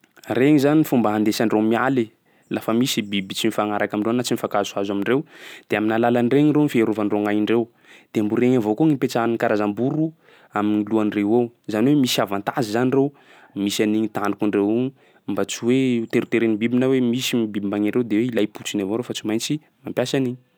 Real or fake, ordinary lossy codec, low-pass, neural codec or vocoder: real; none; none; none